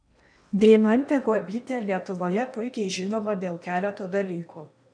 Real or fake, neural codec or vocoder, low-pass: fake; codec, 16 kHz in and 24 kHz out, 0.8 kbps, FocalCodec, streaming, 65536 codes; 9.9 kHz